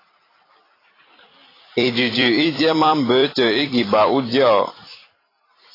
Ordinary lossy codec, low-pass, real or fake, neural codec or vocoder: AAC, 24 kbps; 5.4 kHz; fake; vocoder, 44.1 kHz, 128 mel bands every 256 samples, BigVGAN v2